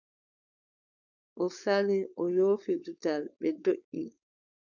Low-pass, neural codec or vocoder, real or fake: 7.2 kHz; codec, 16 kHz, 4.8 kbps, FACodec; fake